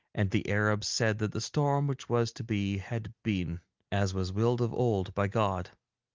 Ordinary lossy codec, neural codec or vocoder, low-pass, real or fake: Opus, 32 kbps; none; 7.2 kHz; real